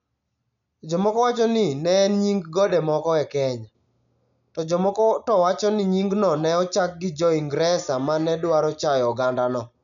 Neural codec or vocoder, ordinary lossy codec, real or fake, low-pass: none; none; real; 7.2 kHz